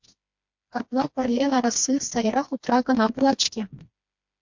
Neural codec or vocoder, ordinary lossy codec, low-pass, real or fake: codec, 16 kHz, 2 kbps, FreqCodec, smaller model; MP3, 48 kbps; 7.2 kHz; fake